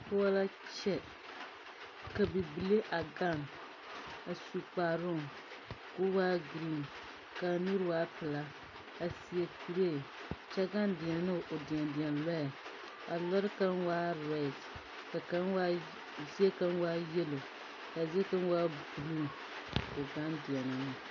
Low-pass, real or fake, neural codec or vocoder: 7.2 kHz; real; none